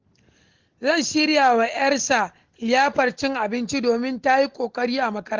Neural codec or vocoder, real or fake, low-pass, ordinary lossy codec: none; real; 7.2 kHz; Opus, 16 kbps